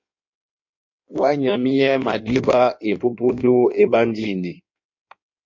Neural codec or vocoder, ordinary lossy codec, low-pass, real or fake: codec, 16 kHz in and 24 kHz out, 1.1 kbps, FireRedTTS-2 codec; MP3, 48 kbps; 7.2 kHz; fake